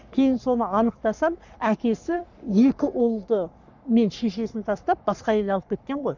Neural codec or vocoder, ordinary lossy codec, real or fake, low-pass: codec, 44.1 kHz, 3.4 kbps, Pupu-Codec; none; fake; 7.2 kHz